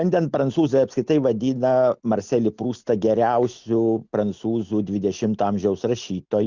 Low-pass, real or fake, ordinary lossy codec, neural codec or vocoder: 7.2 kHz; real; Opus, 64 kbps; none